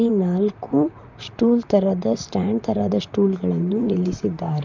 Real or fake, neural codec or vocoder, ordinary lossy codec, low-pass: fake; vocoder, 44.1 kHz, 128 mel bands, Pupu-Vocoder; none; 7.2 kHz